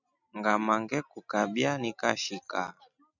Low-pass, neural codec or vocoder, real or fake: 7.2 kHz; none; real